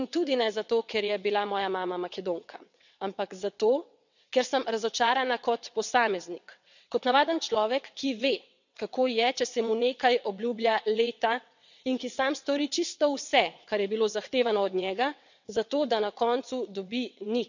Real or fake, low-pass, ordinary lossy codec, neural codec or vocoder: fake; 7.2 kHz; none; vocoder, 22.05 kHz, 80 mel bands, WaveNeXt